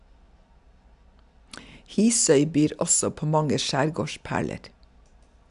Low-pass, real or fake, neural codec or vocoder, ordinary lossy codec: 10.8 kHz; real; none; none